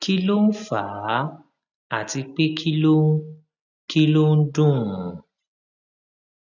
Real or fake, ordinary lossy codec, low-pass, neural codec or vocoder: real; none; 7.2 kHz; none